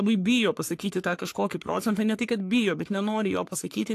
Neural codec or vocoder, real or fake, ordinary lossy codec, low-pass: codec, 44.1 kHz, 3.4 kbps, Pupu-Codec; fake; AAC, 64 kbps; 14.4 kHz